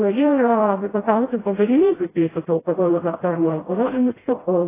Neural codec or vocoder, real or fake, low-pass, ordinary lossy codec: codec, 16 kHz, 0.5 kbps, FreqCodec, smaller model; fake; 3.6 kHz; AAC, 16 kbps